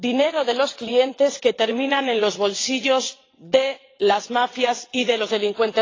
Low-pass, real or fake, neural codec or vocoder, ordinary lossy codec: 7.2 kHz; fake; vocoder, 22.05 kHz, 80 mel bands, WaveNeXt; AAC, 32 kbps